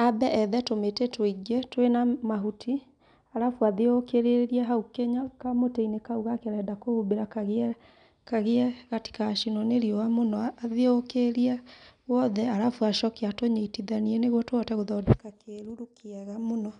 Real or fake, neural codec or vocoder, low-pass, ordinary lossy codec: real; none; 9.9 kHz; none